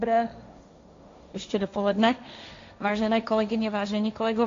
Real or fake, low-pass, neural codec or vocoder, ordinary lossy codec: fake; 7.2 kHz; codec, 16 kHz, 1.1 kbps, Voila-Tokenizer; AAC, 48 kbps